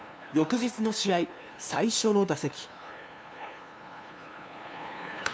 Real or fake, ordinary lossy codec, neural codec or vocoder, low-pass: fake; none; codec, 16 kHz, 2 kbps, FunCodec, trained on LibriTTS, 25 frames a second; none